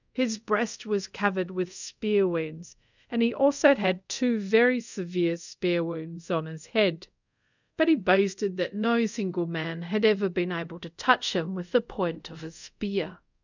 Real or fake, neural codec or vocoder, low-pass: fake; codec, 24 kHz, 0.5 kbps, DualCodec; 7.2 kHz